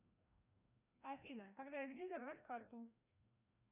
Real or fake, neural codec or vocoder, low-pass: fake; codec, 16 kHz, 1 kbps, FreqCodec, larger model; 3.6 kHz